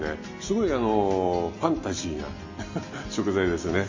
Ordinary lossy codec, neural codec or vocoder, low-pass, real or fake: MP3, 32 kbps; none; 7.2 kHz; real